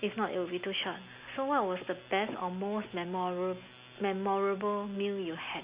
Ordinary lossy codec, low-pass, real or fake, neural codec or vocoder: Opus, 64 kbps; 3.6 kHz; real; none